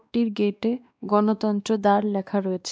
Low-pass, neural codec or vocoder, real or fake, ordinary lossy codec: none; codec, 16 kHz, 2 kbps, X-Codec, WavLM features, trained on Multilingual LibriSpeech; fake; none